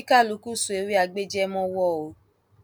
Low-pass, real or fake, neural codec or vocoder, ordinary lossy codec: none; real; none; none